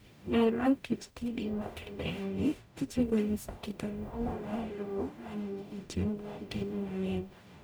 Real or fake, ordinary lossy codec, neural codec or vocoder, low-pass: fake; none; codec, 44.1 kHz, 0.9 kbps, DAC; none